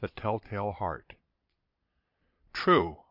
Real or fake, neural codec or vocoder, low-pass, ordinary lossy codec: fake; vocoder, 22.05 kHz, 80 mel bands, Vocos; 5.4 kHz; MP3, 48 kbps